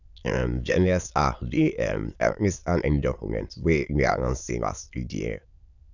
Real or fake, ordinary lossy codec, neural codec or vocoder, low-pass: fake; none; autoencoder, 22.05 kHz, a latent of 192 numbers a frame, VITS, trained on many speakers; 7.2 kHz